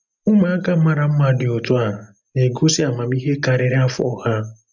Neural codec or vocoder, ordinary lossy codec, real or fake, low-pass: none; none; real; 7.2 kHz